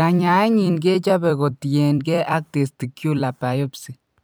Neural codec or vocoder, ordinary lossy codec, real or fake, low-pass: vocoder, 44.1 kHz, 128 mel bands every 256 samples, BigVGAN v2; none; fake; none